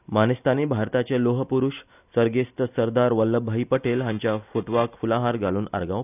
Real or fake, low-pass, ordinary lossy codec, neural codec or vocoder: real; 3.6 kHz; none; none